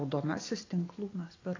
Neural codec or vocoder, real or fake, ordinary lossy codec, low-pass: none; real; AAC, 32 kbps; 7.2 kHz